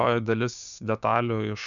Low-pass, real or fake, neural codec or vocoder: 7.2 kHz; fake; codec, 16 kHz, 6 kbps, DAC